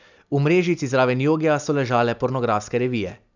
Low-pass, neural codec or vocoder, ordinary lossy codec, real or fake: 7.2 kHz; none; none; real